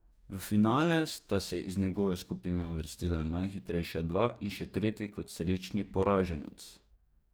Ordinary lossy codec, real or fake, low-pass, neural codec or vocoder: none; fake; none; codec, 44.1 kHz, 2.6 kbps, DAC